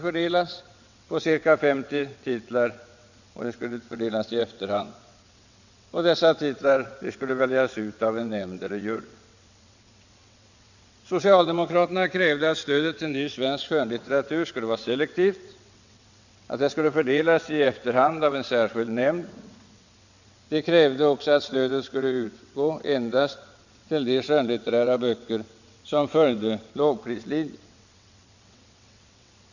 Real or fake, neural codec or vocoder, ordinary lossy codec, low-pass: fake; vocoder, 22.05 kHz, 80 mel bands, WaveNeXt; none; 7.2 kHz